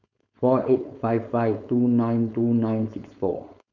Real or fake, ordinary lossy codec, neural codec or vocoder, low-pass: fake; none; codec, 16 kHz, 4.8 kbps, FACodec; 7.2 kHz